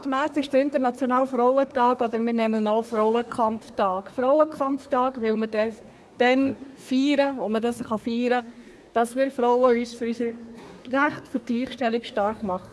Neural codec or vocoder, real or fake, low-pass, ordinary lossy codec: codec, 24 kHz, 1 kbps, SNAC; fake; none; none